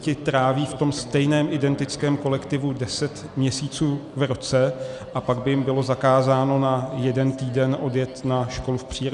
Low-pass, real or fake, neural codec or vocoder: 10.8 kHz; real; none